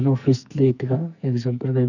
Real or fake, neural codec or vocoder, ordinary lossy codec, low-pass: fake; codec, 44.1 kHz, 2.6 kbps, SNAC; none; 7.2 kHz